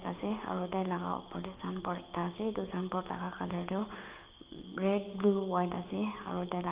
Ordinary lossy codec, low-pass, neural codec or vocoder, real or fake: Opus, 32 kbps; 3.6 kHz; codec, 16 kHz, 6 kbps, DAC; fake